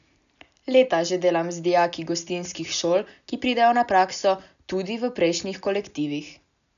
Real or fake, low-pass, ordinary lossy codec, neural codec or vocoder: real; 7.2 kHz; none; none